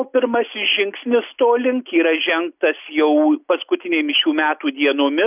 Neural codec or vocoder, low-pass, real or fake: none; 3.6 kHz; real